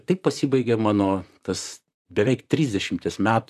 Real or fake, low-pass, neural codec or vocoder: fake; 14.4 kHz; vocoder, 44.1 kHz, 128 mel bands, Pupu-Vocoder